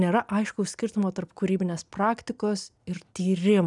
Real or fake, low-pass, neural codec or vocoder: real; 10.8 kHz; none